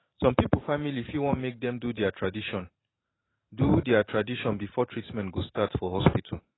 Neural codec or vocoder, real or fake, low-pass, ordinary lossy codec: vocoder, 44.1 kHz, 128 mel bands every 512 samples, BigVGAN v2; fake; 7.2 kHz; AAC, 16 kbps